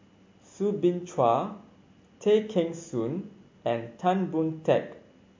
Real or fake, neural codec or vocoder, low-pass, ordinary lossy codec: real; none; 7.2 kHz; MP3, 48 kbps